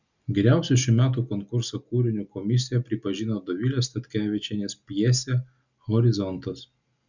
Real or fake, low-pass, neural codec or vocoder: real; 7.2 kHz; none